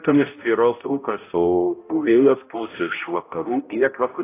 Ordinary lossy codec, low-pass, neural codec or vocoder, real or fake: AAC, 24 kbps; 3.6 kHz; codec, 16 kHz, 0.5 kbps, X-Codec, HuBERT features, trained on balanced general audio; fake